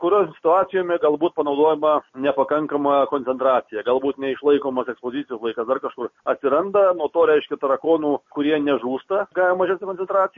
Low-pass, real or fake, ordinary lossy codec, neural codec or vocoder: 7.2 kHz; real; MP3, 32 kbps; none